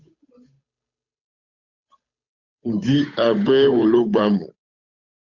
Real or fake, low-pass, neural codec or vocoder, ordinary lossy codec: fake; 7.2 kHz; codec, 16 kHz, 8 kbps, FunCodec, trained on Chinese and English, 25 frames a second; Opus, 64 kbps